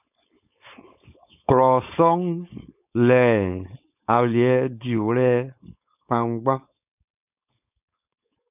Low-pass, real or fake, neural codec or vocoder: 3.6 kHz; fake; codec, 16 kHz, 4.8 kbps, FACodec